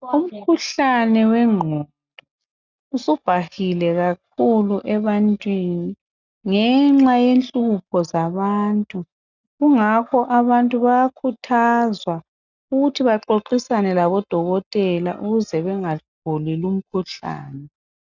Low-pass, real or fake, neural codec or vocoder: 7.2 kHz; real; none